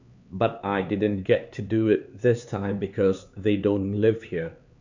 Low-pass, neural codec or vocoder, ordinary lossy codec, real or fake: 7.2 kHz; codec, 16 kHz, 4 kbps, X-Codec, HuBERT features, trained on LibriSpeech; Opus, 64 kbps; fake